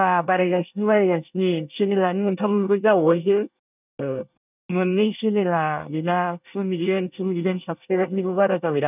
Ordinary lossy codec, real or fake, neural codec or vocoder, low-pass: none; fake; codec, 24 kHz, 1 kbps, SNAC; 3.6 kHz